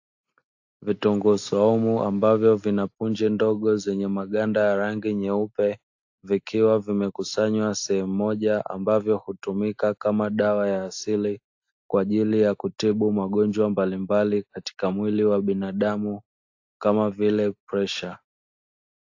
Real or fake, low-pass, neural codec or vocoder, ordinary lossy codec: real; 7.2 kHz; none; AAC, 48 kbps